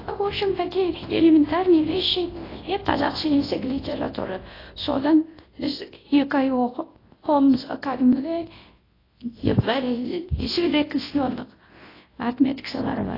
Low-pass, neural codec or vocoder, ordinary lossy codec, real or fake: 5.4 kHz; codec, 24 kHz, 0.9 kbps, WavTokenizer, large speech release; AAC, 24 kbps; fake